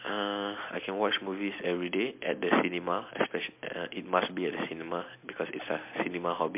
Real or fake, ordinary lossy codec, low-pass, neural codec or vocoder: real; MP3, 32 kbps; 3.6 kHz; none